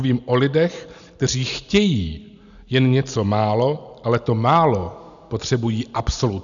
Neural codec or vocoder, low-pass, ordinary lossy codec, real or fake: none; 7.2 kHz; MP3, 96 kbps; real